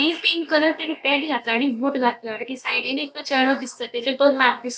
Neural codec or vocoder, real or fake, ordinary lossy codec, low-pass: codec, 16 kHz, about 1 kbps, DyCAST, with the encoder's durations; fake; none; none